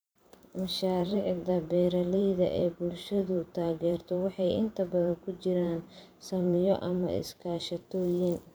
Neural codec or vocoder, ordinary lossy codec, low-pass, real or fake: vocoder, 44.1 kHz, 128 mel bands every 512 samples, BigVGAN v2; none; none; fake